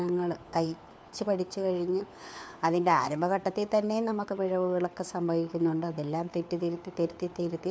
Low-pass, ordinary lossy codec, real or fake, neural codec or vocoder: none; none; fake; codec, 16 kHz, 4 kbps, FunCodec, trained on LibriTTS, 50 frames a second